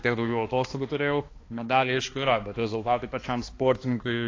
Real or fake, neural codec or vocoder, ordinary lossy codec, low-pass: fake; codec, 16 kHz, 2 kbps, X-Codec, HuBERT features, trained on balanced general audio; AAC, 32 kbps; 7.2 kHz